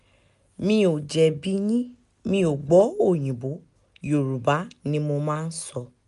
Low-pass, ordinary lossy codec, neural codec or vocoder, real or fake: 10.8 kHz; none; none; real